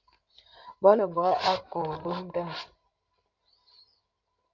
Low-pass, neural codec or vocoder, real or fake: 7.2 kHz; codec, 16 kHz in and 24 kHz out, 2.2 kbps, FireRedTTS-2 codec; fake